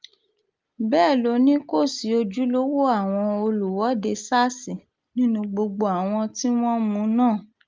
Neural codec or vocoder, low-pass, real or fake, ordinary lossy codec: none; 7.2 kHz; real; Opus, 24 kbps